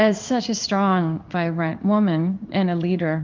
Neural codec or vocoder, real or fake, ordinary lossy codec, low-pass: codec, 16 kHz in and 24 kHz out, 1 kbps, XY-Tokenizer; fake; Opus, 32 kbps; 7.2 kHz